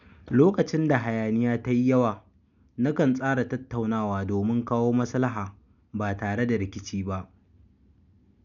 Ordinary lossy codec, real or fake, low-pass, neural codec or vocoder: none; real; 7.2 kHz; none